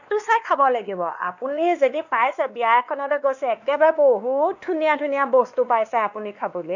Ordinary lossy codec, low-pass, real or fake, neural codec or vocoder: none; 7.2 kHz; fake; codec, 16 kHz, 2 kbps, X-Codec, WavLM features, trained on Multilingual LibriSpeech